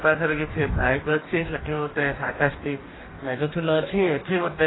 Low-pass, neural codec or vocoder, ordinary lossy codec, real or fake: 7.2 kHz; codec, 44.1 kHz, 2.6 kbps, DAC; AAC, 16 kbps; fake